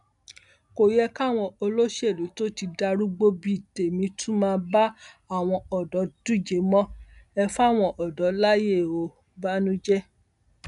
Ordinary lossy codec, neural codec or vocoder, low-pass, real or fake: none; none; 10.8 kHz; real